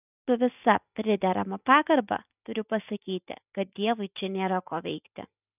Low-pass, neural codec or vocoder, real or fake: 3.6 kHz; none; real